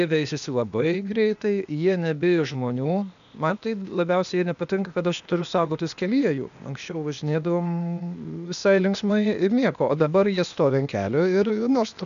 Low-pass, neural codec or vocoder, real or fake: 7.2 kHz; codec, 16 kHz, 0.8 kbps, ZipCodec; fake